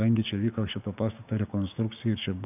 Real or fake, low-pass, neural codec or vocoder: fake; 3.6 kHz; codec, 16 kHz, 6 kbps, DAC